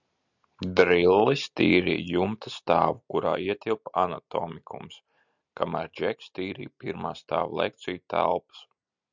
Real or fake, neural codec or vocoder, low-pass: real; none; 7.2 kHz